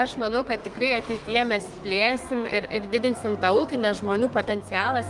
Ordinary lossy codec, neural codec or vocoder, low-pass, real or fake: Opus, 32 kbps; codec, 32 kHz, 1.9 kbps, SNAC; 10.8 kHz; fake